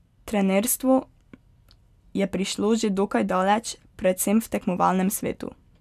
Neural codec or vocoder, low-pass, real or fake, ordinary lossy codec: none; 14.4 kHz; real; none